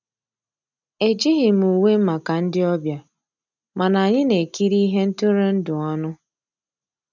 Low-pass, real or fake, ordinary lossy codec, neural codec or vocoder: 7.2 kHz; real; none; none